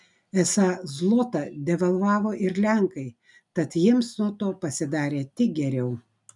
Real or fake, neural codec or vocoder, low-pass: real; none; 10.8 kHz